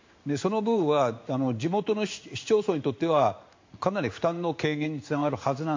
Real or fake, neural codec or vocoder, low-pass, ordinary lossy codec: fake; codec, 16 kHz in and 24 kHz out, 1 kbps, XY-Tokenizer; 7.2 kHz; MP3, 48 kbps